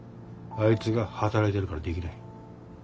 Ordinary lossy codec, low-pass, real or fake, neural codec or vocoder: none; none; real; none